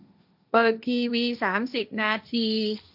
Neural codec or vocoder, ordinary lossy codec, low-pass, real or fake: codec, 16 kHz, 1.1 kbps, Voila-Tokenizer; AAC, 48 kbps; 5.4 kHz; fake